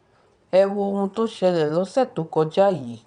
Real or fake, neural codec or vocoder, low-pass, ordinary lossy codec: fake; vocoder, 22.05 kHz, 80 mel bands, WaveNeXt; 9.9 kHz; none